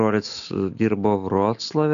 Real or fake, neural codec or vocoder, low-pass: real; none; 7.2 kHz